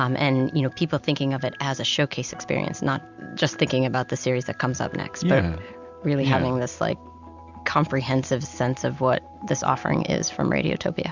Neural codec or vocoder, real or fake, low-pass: none; real; 7.2 kHz